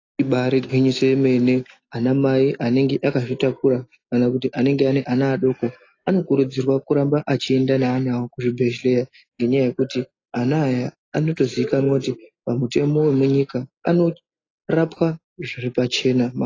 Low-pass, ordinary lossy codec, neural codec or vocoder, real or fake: 7.2 kHz; AAC, 32 kbps; none; real